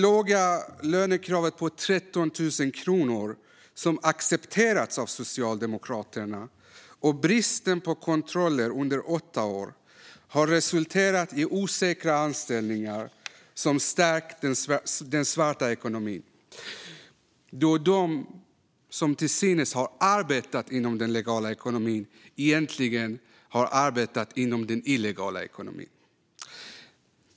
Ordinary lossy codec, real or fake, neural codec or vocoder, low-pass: none; real; none; none